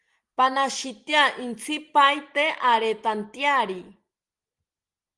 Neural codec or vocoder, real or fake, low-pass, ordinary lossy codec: none; real; 10.8 kHz; Opus, 24 kbps